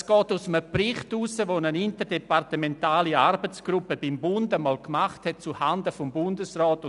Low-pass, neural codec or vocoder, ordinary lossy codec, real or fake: 10.8 kHz; none; none; real